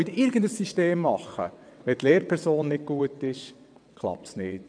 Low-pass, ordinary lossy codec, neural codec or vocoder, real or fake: 9.9 kHz; AAC, 64 kbps; vocoder, 22.05 kHz, 80 mel bands, WaveNeXt; fake